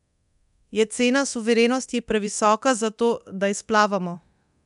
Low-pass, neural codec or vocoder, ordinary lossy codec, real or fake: 10.8 kHz; codec, 24 kHz, 0.9 kbps, DualCodec; none; fake